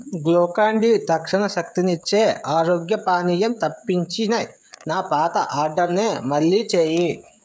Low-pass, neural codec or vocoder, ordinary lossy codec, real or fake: none; codec, 16 kHz, 16 kbps, FreqCodec, smaller model; none; fake